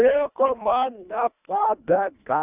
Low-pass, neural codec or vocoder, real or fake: 3.6 kHz; codec, 24 kHz, 1.5 kbps, HILCodec; fake